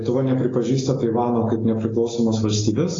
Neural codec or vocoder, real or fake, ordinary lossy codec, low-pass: none; real; AAC, 32 kbps; 7.2 kHz